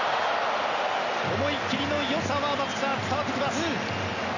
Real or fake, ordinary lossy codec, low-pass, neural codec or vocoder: real; none; 7.2 kHz; none